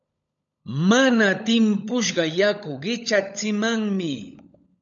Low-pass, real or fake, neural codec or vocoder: 7.2 kHz; fake; codec, 16 kHz, 16 kbps, FunCodec, trained on LibriTTS, 50 frames a second